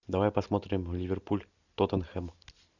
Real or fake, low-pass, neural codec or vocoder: real; 7.2 kHz; none